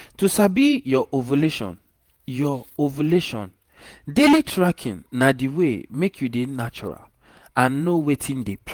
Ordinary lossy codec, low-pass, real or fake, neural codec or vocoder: Opus, 32 kbps; 19.8 kHz; fake; vocoder, 48 kHz, 128 mel bands, Vocos